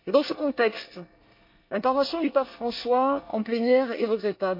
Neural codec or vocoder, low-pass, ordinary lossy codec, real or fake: codec, 24 kHz, 1 kbps, SNAC; 5.4 kHz; MP3, 32 kbps; fake